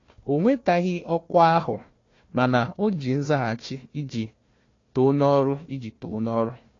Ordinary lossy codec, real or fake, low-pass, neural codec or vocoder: AAC, 32 kbps; fake; 7.2 kHz; codec, 16 kHz, 1 kbps, FunCodec, trained on Chinese and English, 50 frames a second